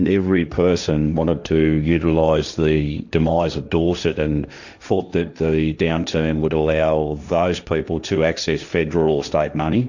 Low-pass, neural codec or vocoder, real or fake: 7.2 kHz; codec, 16 kHz, 1.1 kbps, Voila-Tokenizer; fake